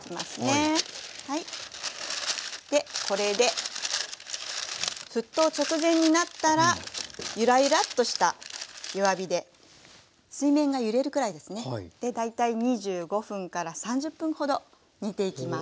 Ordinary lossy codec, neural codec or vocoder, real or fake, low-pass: none; none; real; none